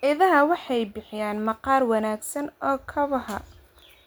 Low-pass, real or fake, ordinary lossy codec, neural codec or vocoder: none; real; none; none